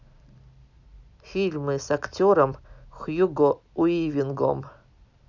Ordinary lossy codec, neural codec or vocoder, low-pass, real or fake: none; none; 7.2 kHz; real